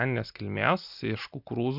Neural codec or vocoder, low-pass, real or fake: none; 5.4 kHz; real